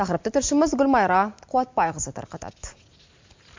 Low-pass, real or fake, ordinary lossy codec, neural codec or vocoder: 7.2 kHz; real; MP3, 48 kbps; none